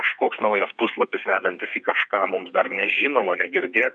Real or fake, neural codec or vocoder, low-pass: fake; codec, 32 kHz, 1.9 kbps, SNAC; 9.9 kHz